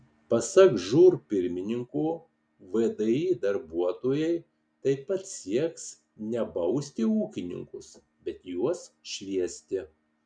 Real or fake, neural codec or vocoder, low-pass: real; none; 9.9 kHz